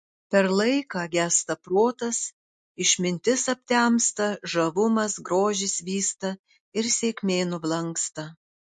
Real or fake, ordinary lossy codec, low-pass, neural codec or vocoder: real; MP3, 48 kbps; 10.8 kHz; none